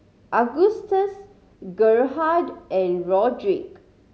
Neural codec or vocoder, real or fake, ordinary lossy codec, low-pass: none; real; none; none